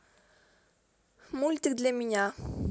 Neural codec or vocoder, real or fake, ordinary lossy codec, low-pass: none; real; none; none